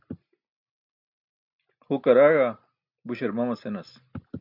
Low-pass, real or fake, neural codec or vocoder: 5.4 kHz; real; none